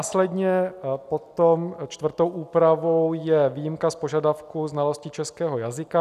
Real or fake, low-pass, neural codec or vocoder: real; 14.4 kHz; none